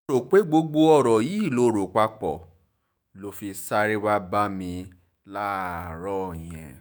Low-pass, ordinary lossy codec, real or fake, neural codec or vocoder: none; none; fake; autoencoder, 48 kHz, 128 numbers a frame, DAC-VAE, trained on Japanese speech